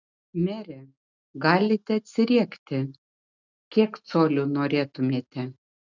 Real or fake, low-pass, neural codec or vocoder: real; 7.2 kHz; none